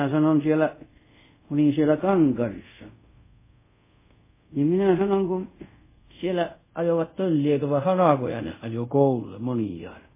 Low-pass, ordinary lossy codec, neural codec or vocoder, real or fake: 3.6 kHz; MP3, 16 kbps; codec, 24 kHz, 0.5 kbps, DualCodec; fake